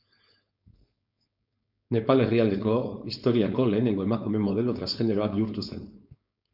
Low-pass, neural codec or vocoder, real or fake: 5.4 kHz; codec, 16 kHz, 4.8 kbps, FACodec; fake